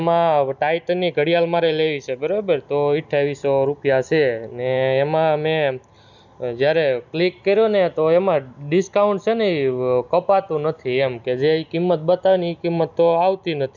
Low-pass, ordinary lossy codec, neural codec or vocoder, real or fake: 7.2 kHz; none; none; real